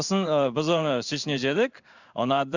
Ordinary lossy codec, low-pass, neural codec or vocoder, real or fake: none; 7.2 kHz; codec, 16 kHz in and 24 kHz out, 1 kbps, XY-Tokenizer; fake